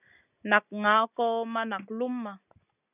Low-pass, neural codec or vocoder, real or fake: 3.6 kHz; none; real